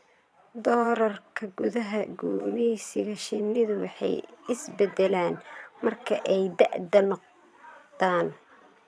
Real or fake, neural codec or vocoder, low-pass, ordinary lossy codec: fake; vocoder, 22.05 kHz, 80 mel bands, Vocos; none; none